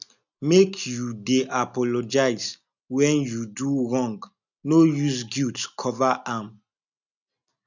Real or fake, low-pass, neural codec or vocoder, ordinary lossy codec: real; 7.2 kHz; none; none